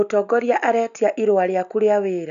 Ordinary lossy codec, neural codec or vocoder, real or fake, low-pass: none; none; real; 7.2 kHz